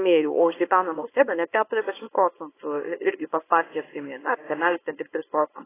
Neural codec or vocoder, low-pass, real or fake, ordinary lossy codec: codec, 24 kHz, 0.9 kbps, WavTokenizer, small release; 3.6 kHz; fake; AAC, 16 kbps